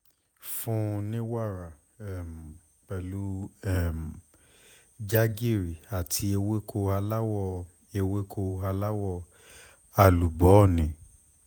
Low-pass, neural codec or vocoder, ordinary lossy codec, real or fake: none; vocoder, 48 kHz, 128 mel bands, Vocos; none; fake